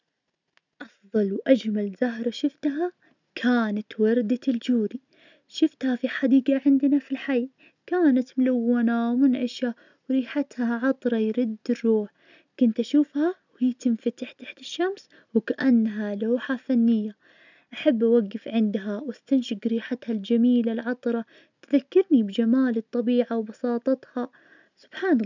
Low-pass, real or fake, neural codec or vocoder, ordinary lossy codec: 7.2 kHz; real; none; none